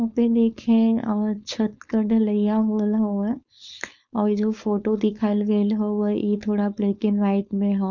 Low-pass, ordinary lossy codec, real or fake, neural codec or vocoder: 7.2 kHz; none; fake; codec, 16 kHz, 4.8 kbps, FACodec